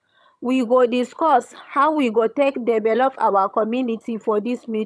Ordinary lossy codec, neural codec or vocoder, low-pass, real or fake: none; vocoder, 22.05 kHz, 80 mel bands, HiFi-GAN; none; fake